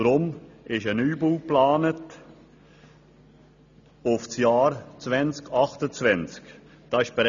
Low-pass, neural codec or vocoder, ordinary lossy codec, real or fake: 7.2 kHz; none; none; real